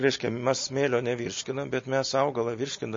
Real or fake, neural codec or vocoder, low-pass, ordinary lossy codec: real; none; 7.2 kHz; MP3, 32 kbps